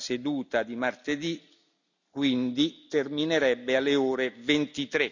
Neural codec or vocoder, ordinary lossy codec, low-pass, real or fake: none; none; 7.2 kHz; real